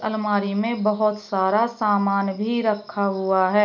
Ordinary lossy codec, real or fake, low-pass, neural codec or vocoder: none; real; 7.2 kHz; none